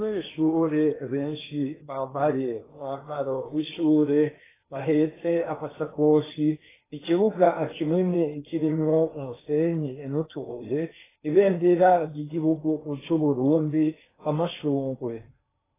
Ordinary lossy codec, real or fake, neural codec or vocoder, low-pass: AAC, 16 kbps; fake; codec, 16 kHz in and 24 kHz out, 0.8 kbps, FocalCodec, streaming, 65536 codes; 3.6 kHz